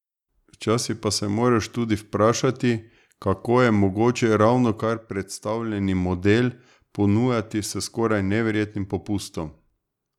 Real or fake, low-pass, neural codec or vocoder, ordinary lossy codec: real; 19.8 kHz; none; none